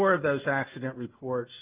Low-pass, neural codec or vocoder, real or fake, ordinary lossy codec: 3.6 kHz; none; real; Opus, 24 kbps